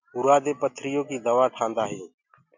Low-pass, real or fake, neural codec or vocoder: 7.2 kHz; real; none